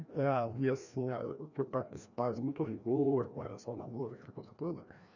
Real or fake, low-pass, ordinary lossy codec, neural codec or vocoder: fake; 7.2 kHz; none; codec, 16 kHz, 1 kbps, FreqCodec, larger model